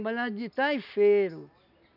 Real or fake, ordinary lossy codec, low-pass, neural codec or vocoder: real; none; 5.4 kHz; none